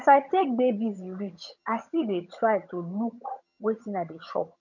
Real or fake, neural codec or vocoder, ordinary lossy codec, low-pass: fake; vocoder, 22.05 kHz, 80 mel bands, HiFi-GAN; none; 7.2 kHz